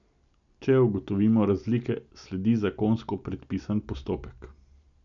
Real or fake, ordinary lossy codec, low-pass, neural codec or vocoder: real; none; 7.2 kHz; none